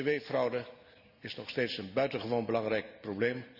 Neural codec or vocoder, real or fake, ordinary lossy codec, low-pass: none; real; none; 5.4 kHz